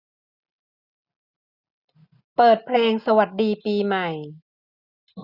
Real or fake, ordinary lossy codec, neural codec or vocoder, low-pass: real; none; none; 5.4 kHz